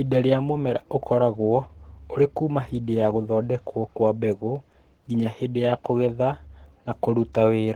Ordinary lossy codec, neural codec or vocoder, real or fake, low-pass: Opus, 16 kbps; codec, 44.1 kHz, 7.8 kbps, Pupu-Codec; fake; 19.8 kHz